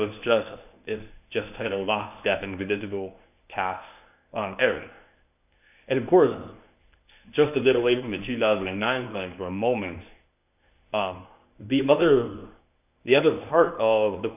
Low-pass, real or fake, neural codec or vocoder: 3.6 kHz; fake; codec, 24 kHz, 0.9 kbps, WavTokenizer, medium speech release version 1